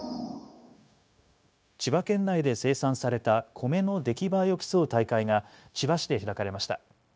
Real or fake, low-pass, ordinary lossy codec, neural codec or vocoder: fake; none; none; codec, 16 kHz, 0.9 kbps, LongCat-Audio-Codec